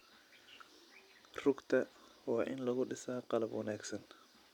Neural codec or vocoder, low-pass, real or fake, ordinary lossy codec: none; 19.8 kHz; real; none